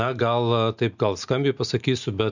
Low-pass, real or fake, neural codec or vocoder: 7.2 kHz; real; none